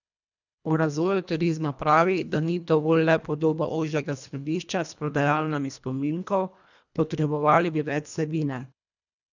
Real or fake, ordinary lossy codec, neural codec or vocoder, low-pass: fake; none; codec, 24 kHz, 1.5 kbps, HILCodec; 7.2 kHz